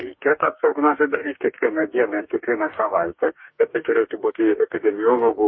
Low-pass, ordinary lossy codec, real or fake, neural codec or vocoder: 7.2 kHz; MP3, 24 kbps; fake; codec, 44.1 kHz, 2.6 kbps, DAC